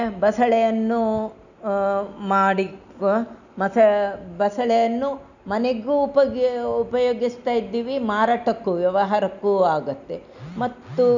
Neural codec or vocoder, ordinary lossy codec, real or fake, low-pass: none; none; real; 7.2 kHz